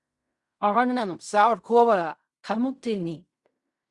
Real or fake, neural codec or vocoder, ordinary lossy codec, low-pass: fake; codec, 16 kHz in and 24 kHz out, 0.4 kbps, LongCat-Audio-Codec, fine tuned four codebook decoder; Opus, 64 kbps; 10.8 kHz